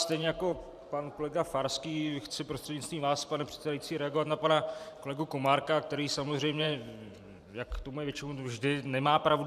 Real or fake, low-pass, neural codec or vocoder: fake; 14.4 kHz; vocoder, 44.1 kHz, 128 mel bands every 512 samples, BigVGAN v2